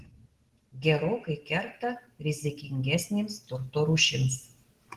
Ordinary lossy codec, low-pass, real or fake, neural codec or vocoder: Opus, 16 kbps; 14.4 kHz; real; none